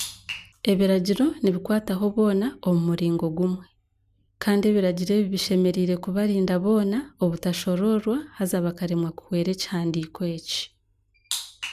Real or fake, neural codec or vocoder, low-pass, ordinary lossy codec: real; none; 14.4 kHz; none